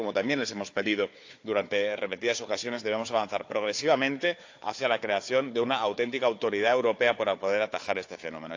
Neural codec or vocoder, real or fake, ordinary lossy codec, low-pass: codec, 16 kHz, 4 kbps, FunCodec, trained on Chinese and English, 50 frames a second; fake; AAC, 48 kbps; 7.2 kHz